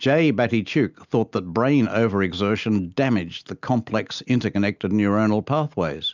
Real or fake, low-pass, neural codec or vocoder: real; 7.2 kHz; none